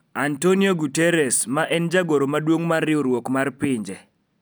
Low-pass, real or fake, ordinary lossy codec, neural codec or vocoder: none; real; none; none